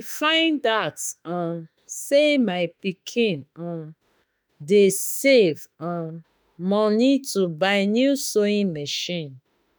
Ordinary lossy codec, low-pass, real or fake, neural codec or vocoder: none; none; fake; autoencoder, 48 kHz, 32 numbers a frame, DAC-VAE, trained on Japanese speech